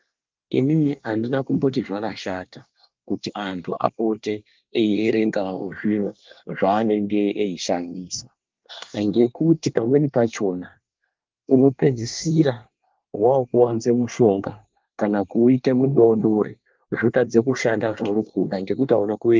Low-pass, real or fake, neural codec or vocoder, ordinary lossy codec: 7.2 kHz; fake; codec, 24 kHz, 1 kbps, SNAC; Opus, 24 kbps